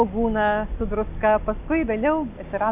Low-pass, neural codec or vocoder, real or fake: 3.6 kHz; none; real